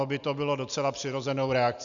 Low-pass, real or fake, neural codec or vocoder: 7.2 kHz; real; none